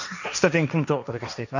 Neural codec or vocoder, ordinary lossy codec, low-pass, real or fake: codec, 16 kHz, 1.1 kbps, Voila-Tokenizer; none; 7.2 kHz; fake